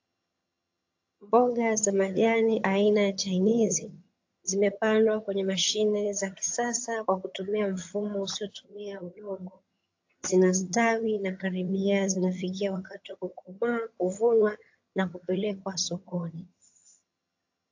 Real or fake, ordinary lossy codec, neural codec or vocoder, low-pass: fake; AAC, 48 kbps; vocoder, 22.05 kHz, 80 mel bands, HiFi-GAN; 7.2 kHz